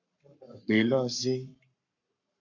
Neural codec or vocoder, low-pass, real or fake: codec, 44.1 kHz, 7.8 kbps, Pupu-Codec; 7.2 kHz; fake